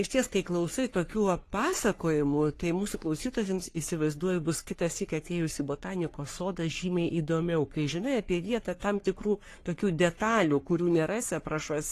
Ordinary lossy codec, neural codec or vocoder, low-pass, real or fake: AAC, 48 kbps; codec, 44.1 kHz, 3.4 kbps, Pupu-Codec; 14.4 kHz; fake